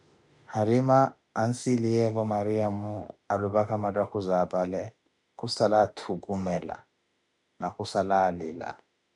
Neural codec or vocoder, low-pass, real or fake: autoencoder, 48 kHz, 32 numbers a frame, DAC-VAE, trained on Japanese speech; 10.8 kHz; fake